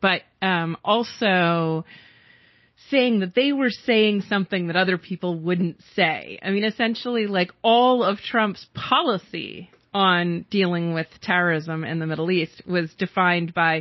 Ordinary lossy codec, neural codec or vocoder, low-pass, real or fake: MP3, 24 kbps; none; 7.2 kHz; real